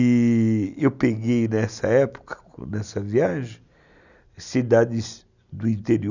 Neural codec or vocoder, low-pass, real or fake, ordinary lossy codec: none; 7.2 kHz; real; none